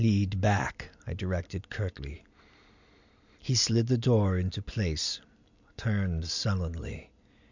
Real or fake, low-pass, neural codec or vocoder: real; 7.2 kHz; none